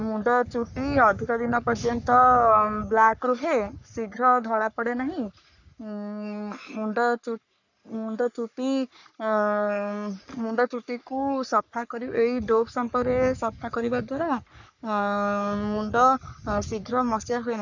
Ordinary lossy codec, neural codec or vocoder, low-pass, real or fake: none; codec, 44.1 kHz, 3.4 kbps, Pupu-Codec; 7.2 kHz; fake